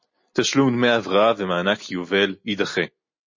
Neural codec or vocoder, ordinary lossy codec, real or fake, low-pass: none; MP3, 32 kbps; real; 7.2 kHz